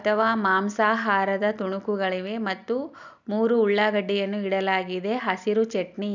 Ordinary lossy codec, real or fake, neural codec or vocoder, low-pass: none; real; none; 7.2 kHz